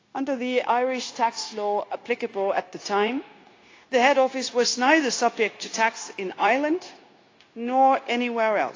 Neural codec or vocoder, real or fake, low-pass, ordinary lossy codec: codec, 16 kHz, 0.9 kbps, LongCat-Audio-Codec; fake; 7.2 kHz; AAC, 32 kbps